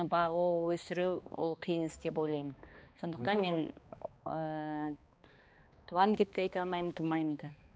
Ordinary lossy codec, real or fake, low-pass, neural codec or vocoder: none; fake; none; codec, 16 kHz, 2 kbps, X-Codec, HuBERT features, trained on balanced general audio